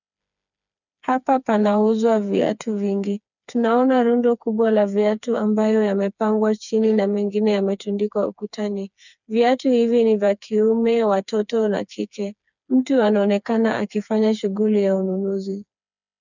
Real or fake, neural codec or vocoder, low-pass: fake; codec, 16 kHz, 4 kbps, FreqCodec, smaller model; 7.2 kHz